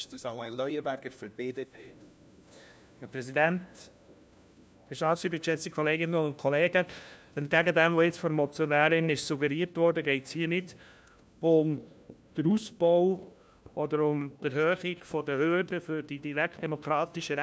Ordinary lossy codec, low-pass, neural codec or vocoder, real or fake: none; none; codec, 16 kHz, 1 kbps, FunCodec, trained on LibriTTS, 50 frames a second; fake